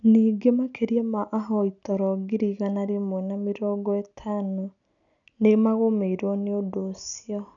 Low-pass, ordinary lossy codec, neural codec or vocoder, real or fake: 7.2 kHz; none; none; real